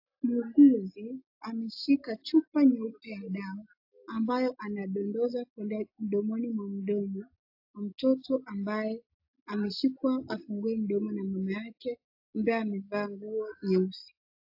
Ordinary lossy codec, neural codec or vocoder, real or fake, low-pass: AAC, 48 kbps; none; real; 5.4 kHz